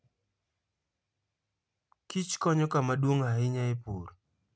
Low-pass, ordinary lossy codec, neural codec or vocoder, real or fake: none; none; none; real